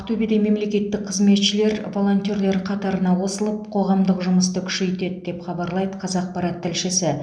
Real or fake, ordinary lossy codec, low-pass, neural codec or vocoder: real; none; 9.9 kHz; none